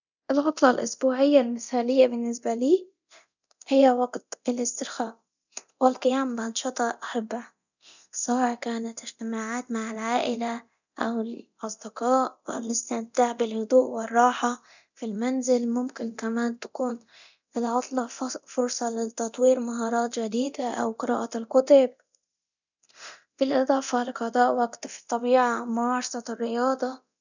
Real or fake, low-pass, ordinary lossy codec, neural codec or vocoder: fake; 7.2 kHz; none; codec, 24 kHz, 0.9 kbps, DualCodec